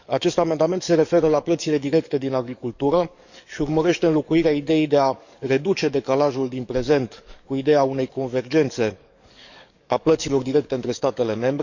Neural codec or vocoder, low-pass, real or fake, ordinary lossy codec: codec, 44.1 kHz, 7.8 kbps, DAC; 7.2 kHz; fake; none